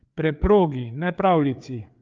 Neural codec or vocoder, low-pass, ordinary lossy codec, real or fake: codec, 16 kHz, 4 kbps, FreqCodec, larger model; 7.2 kHz; Opus, 32 kbps; fake